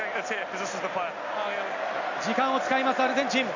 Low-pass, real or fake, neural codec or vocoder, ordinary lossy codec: 7.2 kHz; real; none; none